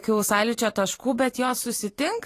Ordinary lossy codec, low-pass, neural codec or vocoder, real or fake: AAC, 48 kbps; 14.4 kHz; vocoder, 44.1 kHz, 128 mel bands every 512 samples, BigVGAN v2; fake